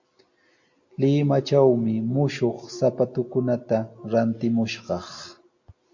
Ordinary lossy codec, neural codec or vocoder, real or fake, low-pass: MP3, 48 kbps; none; real; 7.2 kHz